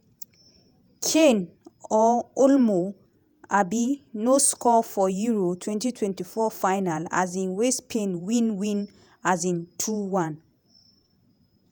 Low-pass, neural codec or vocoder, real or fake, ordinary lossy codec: none; vocoder, 48 kHz, 128 mel bands, Vocos; fake; none